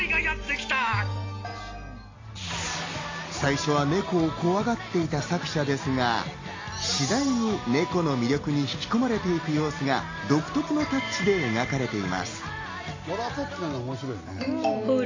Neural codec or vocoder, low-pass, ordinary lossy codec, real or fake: none; 7.2 kHz; AAC, 32 kbps; real